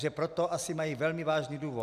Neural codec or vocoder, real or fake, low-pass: none; real; 14.4 kHz